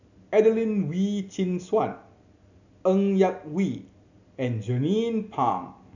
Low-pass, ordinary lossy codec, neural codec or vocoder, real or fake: 7.2 kHz; none; none; real